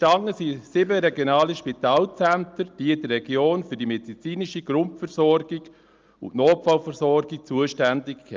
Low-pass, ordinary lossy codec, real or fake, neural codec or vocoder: 7.2 kHz; Opus, 24 kbps; real; none